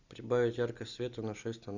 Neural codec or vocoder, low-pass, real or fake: none; 7.2 kHz; real